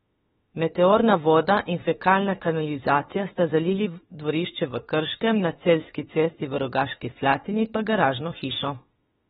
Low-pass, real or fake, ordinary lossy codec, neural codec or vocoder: 19.8 kHz; fake; AAC, 16 kbps; autoencoder, 48 kHz, 32 numbers a frame, DAC-VAE, trained on Japanese speech